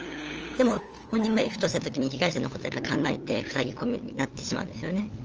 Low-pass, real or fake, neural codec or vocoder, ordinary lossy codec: 7.2 kHz; fake; codec, 16 kHz, 8 kbps, FunCodec, trained on LibriTTS, 25 frames a second; Opus, 24 kbps